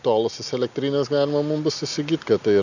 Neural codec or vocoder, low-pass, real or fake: none; 7.2 kHz; real